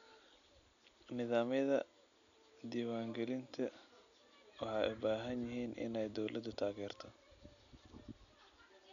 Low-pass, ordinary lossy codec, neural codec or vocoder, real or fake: 7.2 kHz; none; none; real